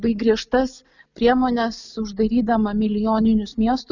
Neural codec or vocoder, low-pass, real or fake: none; 7.2 kHz; real